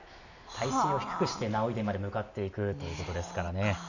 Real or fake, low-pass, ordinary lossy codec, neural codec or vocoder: real; 7.2 kHz; none; none